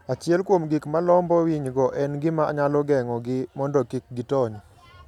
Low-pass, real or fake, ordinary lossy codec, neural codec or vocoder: 19.8 kHz; real; none; none